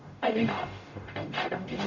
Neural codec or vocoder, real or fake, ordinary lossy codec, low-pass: codec, 44.1 kHz, 0.9 kbps, DAC; fake; none; 7.2 kHz